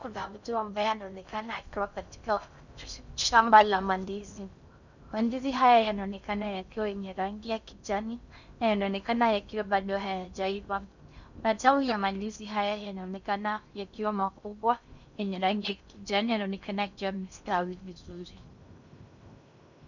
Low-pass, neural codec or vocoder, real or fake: 7.2 kHz; codec, 16 kHz in and 24 kHz out, 0.6 kbps, FocalCodec, streaming, 4096 codes; fake